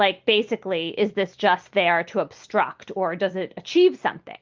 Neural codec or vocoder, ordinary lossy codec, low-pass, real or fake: none; Opus, 32 kbps; 7.2 kHz; real